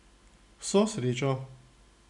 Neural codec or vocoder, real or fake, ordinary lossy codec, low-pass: none; real; none; 10.8 kHz